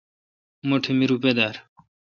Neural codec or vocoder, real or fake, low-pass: none; real; 7.2 kHz